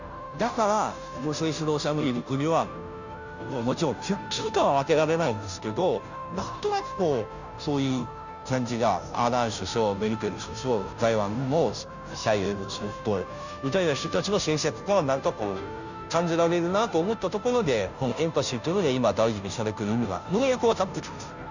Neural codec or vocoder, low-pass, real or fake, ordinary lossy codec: codec, 16 kHz, 0.5 kbps, FunCodec, trained on Chinese and English, 25 frames a second; 7.2 kHz; fake; none